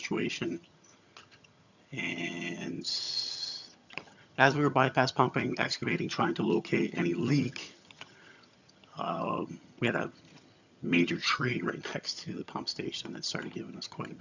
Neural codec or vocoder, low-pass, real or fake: vocoder, 22.05 kHz, 80 mel bands, HiFi-GAN; 7.2 kHz; fake